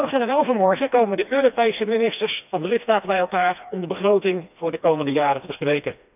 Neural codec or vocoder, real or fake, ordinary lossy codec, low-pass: codec, 16 kHz, 2 kbps, FreqCodec, smaller model; fake; none; 3.6 kHz